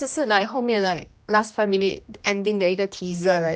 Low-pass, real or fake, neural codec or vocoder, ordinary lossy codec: none; fake; codec, 16 kHz, 1 kbps, X-Codec, HuBERT features, trained on general audio; none